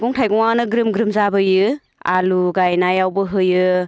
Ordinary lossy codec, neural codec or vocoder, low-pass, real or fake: none; none; none; real